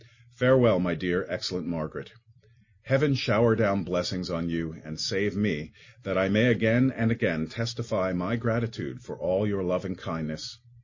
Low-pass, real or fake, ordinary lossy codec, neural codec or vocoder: 7.2 kHz; real; MP3, 32 kbps; none